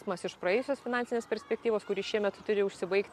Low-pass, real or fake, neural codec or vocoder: 14.4 kHz; real; none